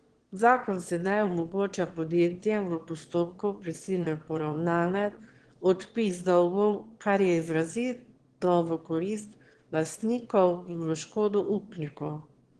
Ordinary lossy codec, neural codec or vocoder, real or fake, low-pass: Opus, 16 kbps; autoencoder, 22.05 kHz, a latent of 192 numbers a frame, VITS, trained on one speaker; fake; 9.9 kHz